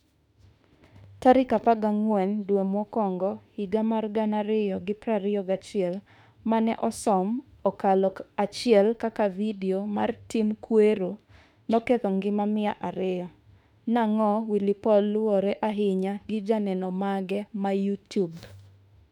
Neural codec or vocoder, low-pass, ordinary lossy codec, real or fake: autoencoder, 48 kHz, 32 numbers a frame, DAC-VAE, trained on Japanese speech; 19.8 kHz; none; fake